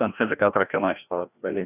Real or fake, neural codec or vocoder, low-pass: fake; codec, 16 kHz, 1 kbps, FreqCodec, larger model; 3.6 kHz